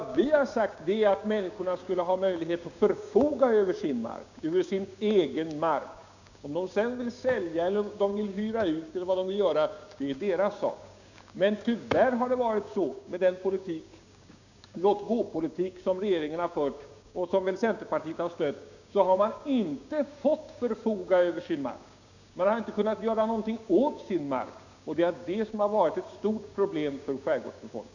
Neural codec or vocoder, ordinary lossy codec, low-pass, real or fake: codec, 16 kHz, 6 kbps, DAC; none; 7.2 kHz; fake